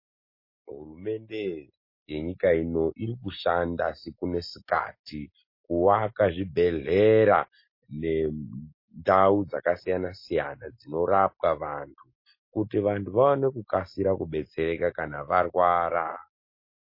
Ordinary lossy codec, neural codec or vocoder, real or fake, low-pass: MP3, 24 kbps; none; real; 5.4 kHz